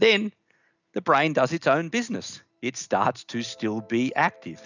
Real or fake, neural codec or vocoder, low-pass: real; none; 7.2 kHz